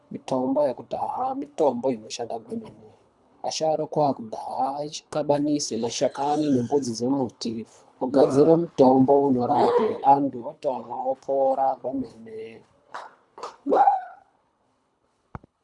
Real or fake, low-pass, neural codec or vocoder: fake; 10.8 kHz; codec, 24 kHz, 3 kbps, HILCodec